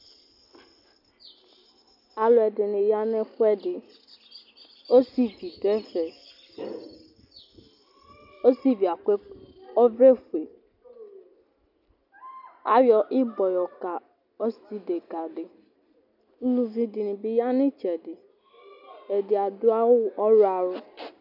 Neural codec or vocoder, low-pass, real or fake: none; 5.4 kHz; real